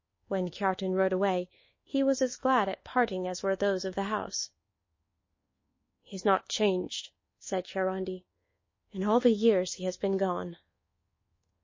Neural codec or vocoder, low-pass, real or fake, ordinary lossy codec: codec, 24 kHz, 1.2 kbps, DualCodec; 7.2 kHz; fake; MP3, 32 kbps